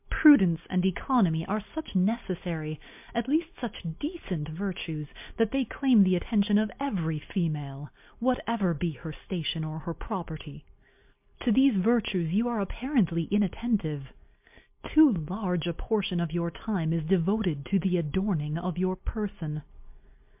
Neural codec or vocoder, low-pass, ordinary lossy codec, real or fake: none; 3.6 kHz; MP3, 32 kbps; real